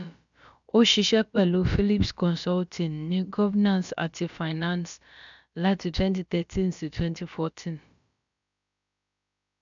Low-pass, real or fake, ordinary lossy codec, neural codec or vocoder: 7.2 kHz; fake; none; codec, 16 kHz, about 1 kbps, DyCAST, with the encoder's durations